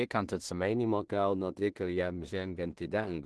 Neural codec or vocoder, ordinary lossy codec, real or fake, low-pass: codec, 16 kHz in and 24 kHz out, 0.4 kbps, LongCat-Audio-Codec, two codebook decoder; Opus, 16 kbps; fake; 10.8 kHz